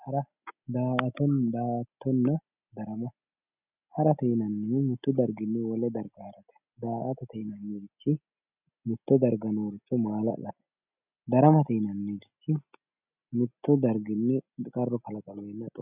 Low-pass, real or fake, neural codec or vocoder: 3.6 kHz; real; none